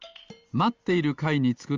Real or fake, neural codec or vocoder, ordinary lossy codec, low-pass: real; none; Opus, 32 kbps; 7.2 kHz